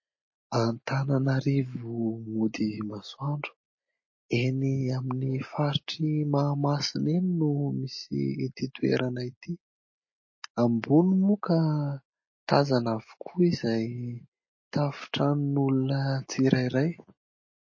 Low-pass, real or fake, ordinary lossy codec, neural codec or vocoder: 7.2 kHz; real; MP3, 32 kbps; none